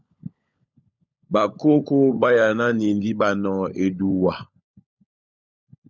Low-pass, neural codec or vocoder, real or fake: 7.2 kHz; codec, 16 kHz, 16 kbps, FunCodec, trained on LibriTTS, 50 frames a second; fake